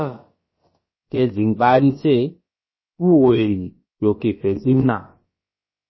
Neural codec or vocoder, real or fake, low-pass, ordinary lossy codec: codec, 16 kHz, about 1 kbps, DyCAST, with the encoder's durations; fake; 7.2 kHz; MP3, 24 kbps